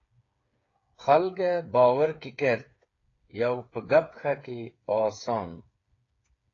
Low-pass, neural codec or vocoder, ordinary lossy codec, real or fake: 7.2 kHz; codec, 16 kHz, 8 kbps, FreqCodec, smaller model; AAC, 32 kbps; fake